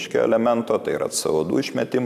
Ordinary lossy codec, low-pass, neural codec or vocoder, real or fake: MP3, 96 kbps; 14.4 kHz; none; real